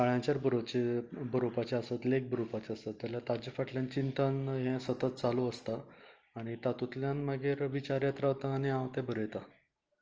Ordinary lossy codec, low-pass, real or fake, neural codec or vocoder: Opus, 24 kbps; 7.2 kHz; real; none